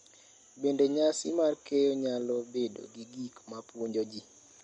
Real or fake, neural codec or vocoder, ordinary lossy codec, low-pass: real; none; MP3, 48 kbps; 10.8 kHz